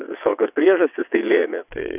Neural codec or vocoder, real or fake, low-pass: vocoder, 22.05 kHz, 80 mel bands, WaveNeXt; fake; 3.6 kHz